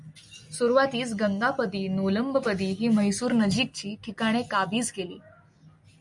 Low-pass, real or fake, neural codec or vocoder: 10.8 kHz; real; none